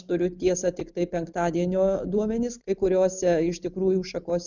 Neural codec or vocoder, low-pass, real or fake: none; 7.2 kHz; real